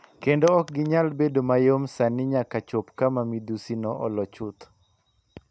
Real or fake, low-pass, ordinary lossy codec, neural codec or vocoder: real; none; none; none